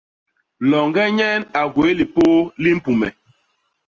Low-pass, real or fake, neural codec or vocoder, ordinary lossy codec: 7.2 kHz; real; none; Opus, 24 kbps